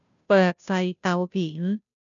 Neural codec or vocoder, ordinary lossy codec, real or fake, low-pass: codec, 16 kHz, 0.5 kbps, FunCodec, trained on Chinese and English, 25 frames a second; none; fake; 7.2 kHz